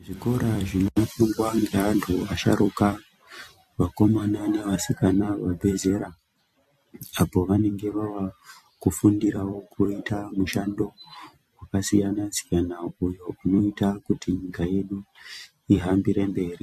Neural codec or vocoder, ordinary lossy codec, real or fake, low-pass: vocoder, 44.1 kHz, 128 mel bands every 256 samples, BigVGAN v2; MP3, 64 kbps; fake; 14.4 kHz